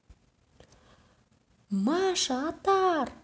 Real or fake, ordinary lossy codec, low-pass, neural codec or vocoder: real; none; none; none